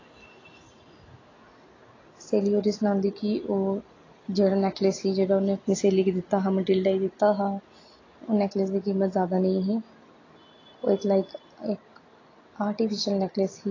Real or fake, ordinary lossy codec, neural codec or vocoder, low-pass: real; AAC, 32 kbps; none; 7.2 kHz